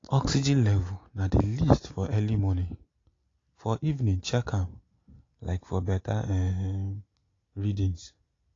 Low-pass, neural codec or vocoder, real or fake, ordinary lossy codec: 7.2 kHz; none; real; AAC, 32 kbps